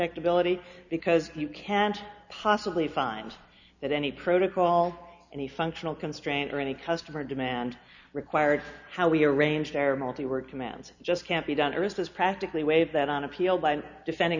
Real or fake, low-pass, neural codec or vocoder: real; 7.2 kHz; none